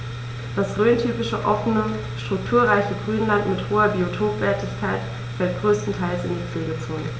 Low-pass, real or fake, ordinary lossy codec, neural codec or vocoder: none; real; none; none